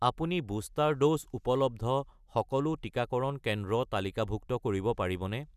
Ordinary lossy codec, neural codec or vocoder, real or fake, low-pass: none; none; real; 14.4 kHz